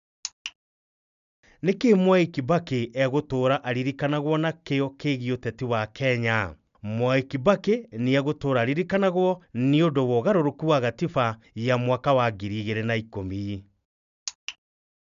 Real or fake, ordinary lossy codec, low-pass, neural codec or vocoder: real; none; 7.2 kHz; none